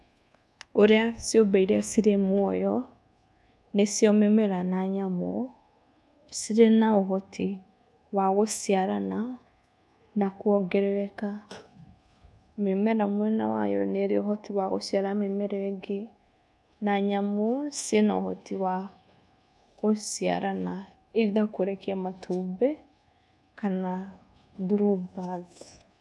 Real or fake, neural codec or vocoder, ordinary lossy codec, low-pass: fake; codec, 24 kHz, 1.2 kbps, DualCodec; none; none